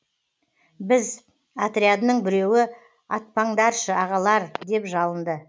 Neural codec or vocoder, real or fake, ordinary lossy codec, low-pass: none; real; none; none